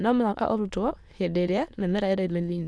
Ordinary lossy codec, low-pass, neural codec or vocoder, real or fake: none; none; autoencoder, 22.05 kHz, a latent of 192 numbers a frame, VITS, trained on many speakers; fake